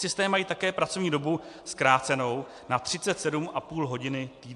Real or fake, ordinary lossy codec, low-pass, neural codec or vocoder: real; AAC, 64 kbps; 10.8 kHz; none